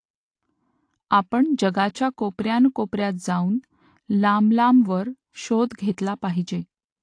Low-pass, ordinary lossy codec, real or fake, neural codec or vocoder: 9.9 kHz; AAC, 48 kbps; real; none